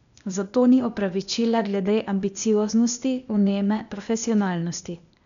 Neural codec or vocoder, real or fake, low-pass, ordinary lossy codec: codec, 16 kHz, 0.8 kbps, ZipCodec; fake; 7.2 kHz; none